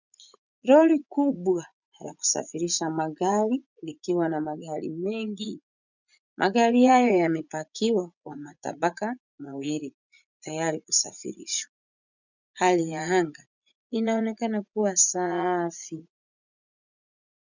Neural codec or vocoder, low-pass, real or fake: vocoder, 44.1 kHz, 80 mel bands, Vocos; 7.2 kHz; fake